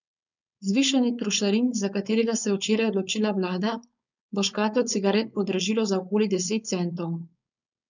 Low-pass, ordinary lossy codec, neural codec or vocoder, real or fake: 7.2 kHz; none; codec, 16 kHz, 4.8 kbps, FACodec; fake